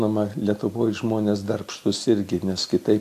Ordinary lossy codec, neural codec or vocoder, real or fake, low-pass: AAC, 64 kbps; none; real; 14.4 kHz